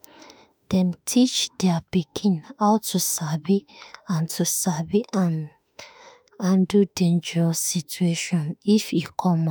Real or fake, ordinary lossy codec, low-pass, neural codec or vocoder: fake; none; none; autoencoder, 48 kHz, 32 numbers a frame, DAC-VAE, trained on Japanese speech